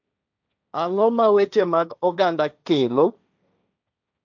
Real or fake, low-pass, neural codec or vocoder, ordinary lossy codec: fake; 7.2 kHz; codec, 16 kHz, 1.1 kbps, Voila-Tokenizer; none